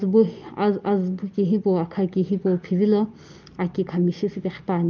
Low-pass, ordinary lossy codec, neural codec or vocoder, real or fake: 7.2 kHz; Opus, 24 kbps; none; real